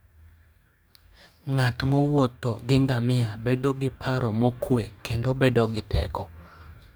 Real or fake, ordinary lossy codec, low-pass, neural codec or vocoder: fake; none; none; codec, 44.1 kHz, 2.6 kbps, DAC